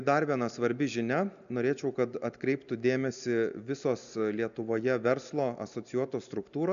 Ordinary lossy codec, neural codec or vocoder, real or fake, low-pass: MP3, 96 kbps; none; real; 7.2 kHz